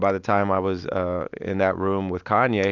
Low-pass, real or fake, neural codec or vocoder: 7.2 kHz; real; none